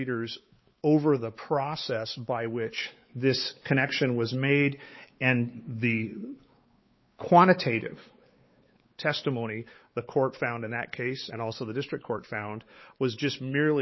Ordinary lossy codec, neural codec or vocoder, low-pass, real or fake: MP3, 24 kbps; codec, 16 kHz, 4 kbps, X-Codec, WavLM features, trained on Multilingual LibriSpeech; 7.2 kHz; fake